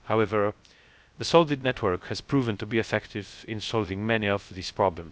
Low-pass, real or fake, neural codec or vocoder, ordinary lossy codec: none; fake; codec, 16 kHz, 0.3 kbps, FocalCodec; none